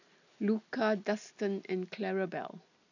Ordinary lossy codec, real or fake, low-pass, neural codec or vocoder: none; real; 7.2 kHz; none